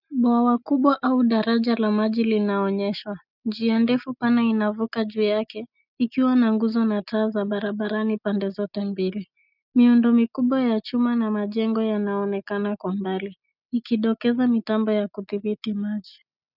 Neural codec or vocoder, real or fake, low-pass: none; real; 5.4 kHz